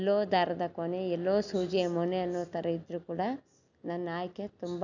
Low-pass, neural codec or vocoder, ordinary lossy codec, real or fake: 7.2 kHz; none; none; real